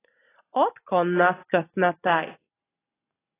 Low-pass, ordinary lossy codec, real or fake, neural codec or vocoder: 3.6 kHz; AAC, 16 kbps; real; none